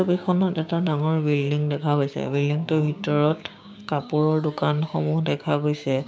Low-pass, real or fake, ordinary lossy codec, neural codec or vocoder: none; fake; none; codec, 16 kHz, 6 kbps, DAC